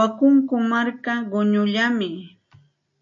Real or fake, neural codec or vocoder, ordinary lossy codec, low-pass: real; none; MP3, 48 kbps; 7.2 kHz